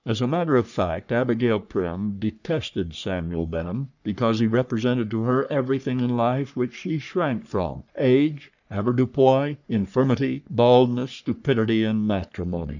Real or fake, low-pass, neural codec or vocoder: fake; 7.2 kHz; codec, 44.1 kHz, 3.4 kbps, Pupu-Codec